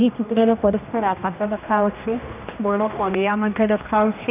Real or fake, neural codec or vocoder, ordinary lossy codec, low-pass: fake; codec, 16 kHz, 1 kbps, X-Codec, HuBERT features, trained on general audio; none; 3.6 kHz